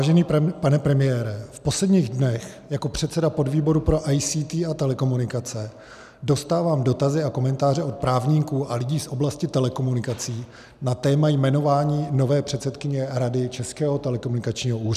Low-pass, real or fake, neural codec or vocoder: 14.4 kHz; real; none